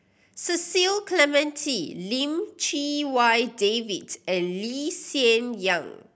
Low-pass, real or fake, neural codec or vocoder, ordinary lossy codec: none; real; none; none